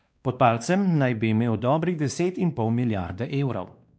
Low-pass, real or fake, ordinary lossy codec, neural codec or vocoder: none; fake; none; codec, 16 kHz, 2 kbps, X-Codec, WavLM features, trained on Multilingual LibriSpeech